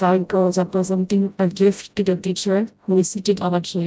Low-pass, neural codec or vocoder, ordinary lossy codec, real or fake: none; codec, 16 kHz, 0.5 kbps, FreqCodec, smaller model; none; fake